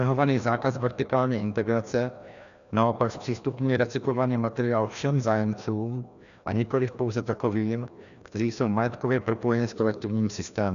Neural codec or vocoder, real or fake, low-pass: codec, 16 kHz, 1 kbps, FreqCodec, larger model; fake; 7.2 kHz